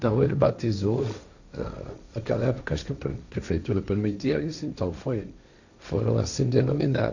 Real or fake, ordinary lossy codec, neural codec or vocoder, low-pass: fake; none; codec, 16 kHz, 1.1 kbps, Voila-Tokenizer; 7.2 kHz